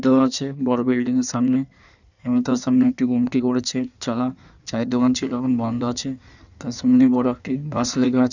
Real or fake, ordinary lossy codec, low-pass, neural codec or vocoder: fake; none; 7.2 kHz; codec, 16 kHz in and 24 kHz out, 1.1 kbps, FireRedTTS-2 codec